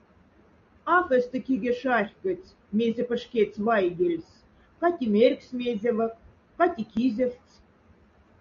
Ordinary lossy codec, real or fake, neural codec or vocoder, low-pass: AAC, 64 kbps; real; none; 7.2 kHz